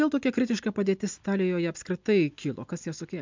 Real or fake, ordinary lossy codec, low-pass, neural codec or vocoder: real; MP3, 64 kbps; 7.2 kHz; none